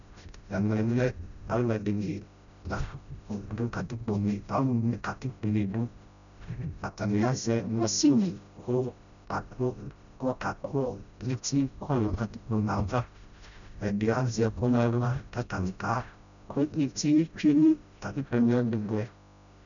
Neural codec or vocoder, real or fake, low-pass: codec, 16 kHz, 0.5 kbps, FreqCodec, smaller model; fake; 7.2 kHz